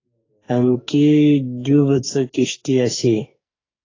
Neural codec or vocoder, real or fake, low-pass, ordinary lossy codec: codec, 44.1 kHz, 2.6 kbps, SNAC; fake; 7.2 kHz; AAC, 32 kbps